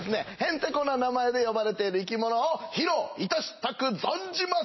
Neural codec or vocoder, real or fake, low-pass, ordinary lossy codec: none; real; 7.2 kHz; MP3, 24 kbps